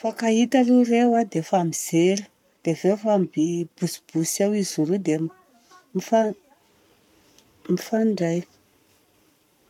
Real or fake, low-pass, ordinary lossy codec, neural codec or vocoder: fake; 19.8 kHz; none; codec, 44.1 kHz, 7.8 kbps, Pupu-Codec